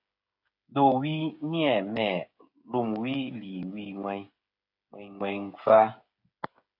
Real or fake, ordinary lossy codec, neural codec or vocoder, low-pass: fake; AAC, 48 kbps; codec, 16 kHz, 8 kbps, FreqCodec, smaller model; 5.4 kHz